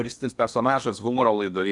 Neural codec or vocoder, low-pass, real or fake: codec, 16 kHz in and 24 kHz out, 0.8 kbps, FocalCodec, streaming, 65536 codes; 10.8 kHz; fake